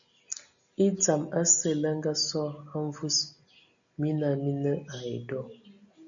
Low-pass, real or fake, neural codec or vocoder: 7.2 kHz; real; none